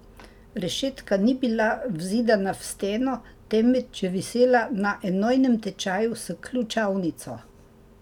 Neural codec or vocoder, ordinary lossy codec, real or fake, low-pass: none; none; real; 19.8 kHz